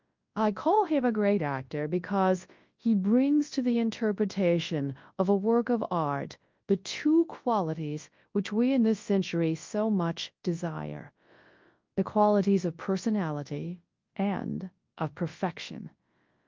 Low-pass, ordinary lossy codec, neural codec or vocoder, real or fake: 7.2 kHz; Opus, 32 kbps; codec, 24 kHz, 0.9 kbps, WavTokenizer, large speech release; fake